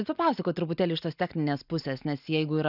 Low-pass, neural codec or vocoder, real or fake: 5.4 kHz; none; real